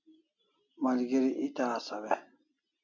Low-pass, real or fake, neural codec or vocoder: 7.2 kHz; real; none